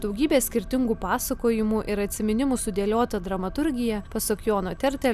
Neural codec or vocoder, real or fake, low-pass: none; real; 14.4 kHz